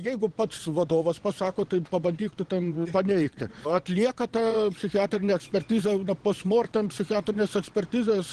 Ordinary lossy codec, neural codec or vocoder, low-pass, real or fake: Opus, 16 kbps; vocoder, 22.05 kHz, 80 mel bands, Vocos; 9.9 kHz; fake